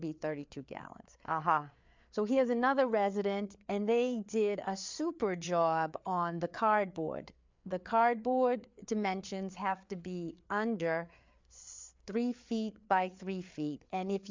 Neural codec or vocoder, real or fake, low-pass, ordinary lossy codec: codec, 16 kHz, 4 kbps, FreqCodec, larger model; fake; 7.2 kHz; MP3, 64 kbps